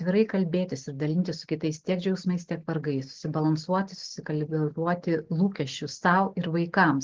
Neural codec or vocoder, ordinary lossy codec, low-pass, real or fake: none; Opus, 16 kbps; 7.2 kHz; real